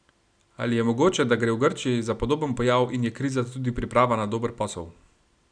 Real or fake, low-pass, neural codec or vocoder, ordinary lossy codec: real; 9.9 kHz; none; none